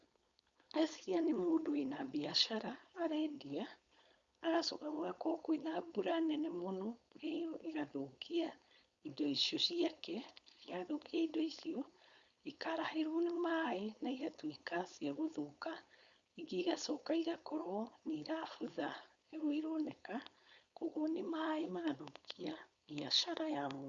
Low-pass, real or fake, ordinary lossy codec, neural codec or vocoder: 7.2 kHz; fake; Opus, 64 kbps; codec, 16 kHz, 4.8 kbps, FACodec